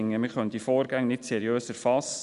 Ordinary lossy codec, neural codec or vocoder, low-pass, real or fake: none; none; 10.8 kHz; real